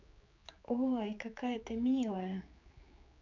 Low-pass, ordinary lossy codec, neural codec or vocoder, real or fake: 7.2 kHz; MP3, 64 kbps; codec, 16 kHz, 4 kbps, X-Codec, HuBERT features, trained on general audio; fake